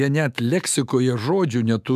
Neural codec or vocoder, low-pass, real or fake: autoencoder, 48 kHz, 128 numbers a frame, DAC-VAE, trained on Japanese speech; 14.4 kHz; fake